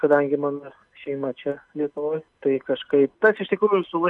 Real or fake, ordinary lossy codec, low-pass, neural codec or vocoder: real; AAC, 64 kbps; 10.8 kHz; none